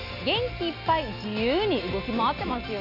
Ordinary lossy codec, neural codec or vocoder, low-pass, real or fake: none; none; 5.4 kHz; real